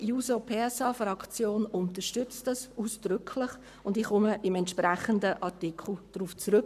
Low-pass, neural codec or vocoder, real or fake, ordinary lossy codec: 14.4 kHz; codec, 44.1 kHz, 7.8 kbps, Pupu-Codec; fake; none